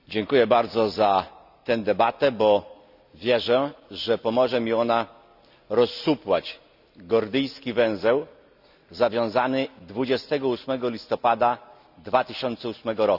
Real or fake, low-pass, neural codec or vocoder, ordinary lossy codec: real; 5.4 kHz; none; none